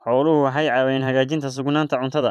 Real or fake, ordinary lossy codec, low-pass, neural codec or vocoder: fake; none; 14.4 kHz; vocoder, 44.1 kHz, 128 mel bands every 512 samples, BigVGAN v2